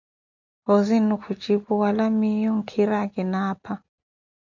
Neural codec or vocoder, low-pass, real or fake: none; 7.2 kHz; real